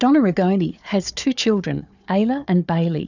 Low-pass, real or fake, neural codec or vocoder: 7.2 kHz; fake; codec, 16 kHz, 4 kbps, FunCodec, trained on Chinese and English, 50 frames a second